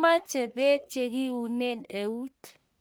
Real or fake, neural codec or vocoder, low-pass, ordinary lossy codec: fake; codec, 44.1 kHz, 1.7 kbps, Pupu-Codec; none; none